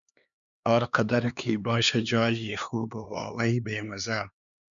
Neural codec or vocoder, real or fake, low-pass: codec, 16 kHz, 2 kbps, X-Codec, HuBERT features, trained on LibriSpeech; fake; 7.2 kHz